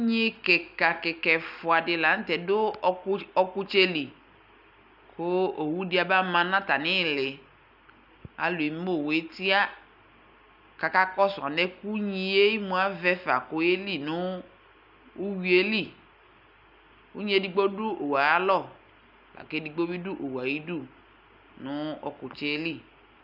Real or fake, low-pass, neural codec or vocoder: real; 5.4 kHz; none